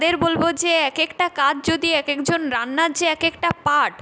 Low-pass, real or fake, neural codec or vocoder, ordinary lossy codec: none; real; none; none